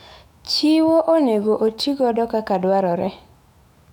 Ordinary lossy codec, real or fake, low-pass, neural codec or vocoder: none; fake; 19.8 kHz; autoencoder, 48 kHz, 128 numbers a frame, DAC-VAE, trained on Japanese speech